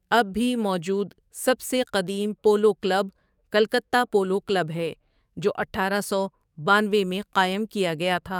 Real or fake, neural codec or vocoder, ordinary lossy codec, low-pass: fake; codec, 44.1 kHz, 7.8 kbps, DAC; none; 19.8 kHz